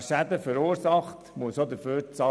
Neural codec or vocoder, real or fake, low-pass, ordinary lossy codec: none; real; none; none